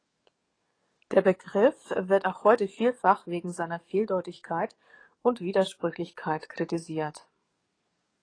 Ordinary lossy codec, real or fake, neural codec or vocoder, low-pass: AAC, 32 kbps; fake; codec, 44.1 kHz, 7.8 kbps, DAC; 9.9 kHz